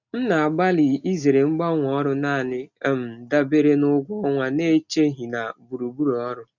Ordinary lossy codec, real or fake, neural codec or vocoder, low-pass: none; real; none; 7.2 kHz